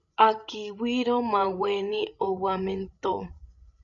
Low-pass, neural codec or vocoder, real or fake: 7.2 kHz; codec, 16 kHz, 16 kbps, FreqCodec, larger model; fake